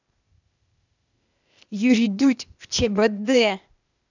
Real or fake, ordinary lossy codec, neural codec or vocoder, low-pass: fake; none; codec, 16 kHz, 0.8 kbps, ZipCodec; 7.2 kHz